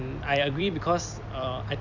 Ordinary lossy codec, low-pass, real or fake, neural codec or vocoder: none; 7.2 kHz; fake; vocoder, 44.1 kHz, 128 mel bands every 256 samples, BigVGAN v2